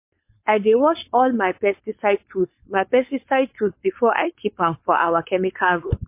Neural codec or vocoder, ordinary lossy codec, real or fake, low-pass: codec, 16 kHz, 4.8 kbps, FACodec; MP3, 24 kbps; fake; 3.6 kHz